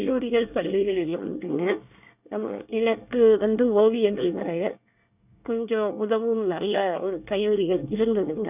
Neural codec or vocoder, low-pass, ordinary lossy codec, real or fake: codec, 24 kHz, 1 kbps, SNAC; 3.6 kHz; none; fake